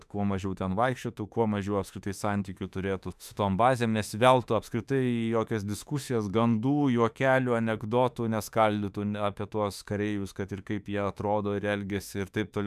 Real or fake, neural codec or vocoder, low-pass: fake; autoencoder, 48 kHz, 32 numbers a frame, DAC-VAE, trained on Japanese speech; 14.4 kHz